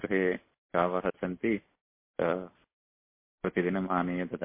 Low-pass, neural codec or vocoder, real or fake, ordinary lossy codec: 3.6 kHz; none; real; MP3, 24 kbps